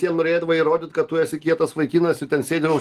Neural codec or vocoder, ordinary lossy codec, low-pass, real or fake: none; Opus, 24 kbps; 14.4 kHz; real